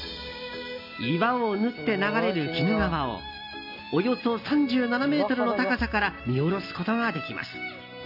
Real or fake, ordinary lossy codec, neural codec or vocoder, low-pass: real; none; none; 5.4 kHz